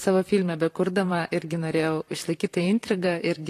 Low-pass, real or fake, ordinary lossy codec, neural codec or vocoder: 14.4 kHz; fake; AAC, 48 kbps; vocoder, 44.1 kHz, 128 mel bands, Pupu-Vocoder